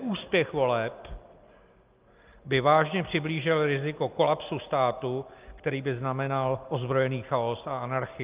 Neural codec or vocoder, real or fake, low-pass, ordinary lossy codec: none; real; 3.6 kHz; Opus, 32 kbps